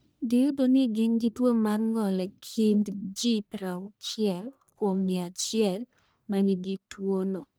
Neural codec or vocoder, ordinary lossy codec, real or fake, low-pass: codec, 44.1 kHz, 1.7 kbps, Pupu-Codec; none; fake; none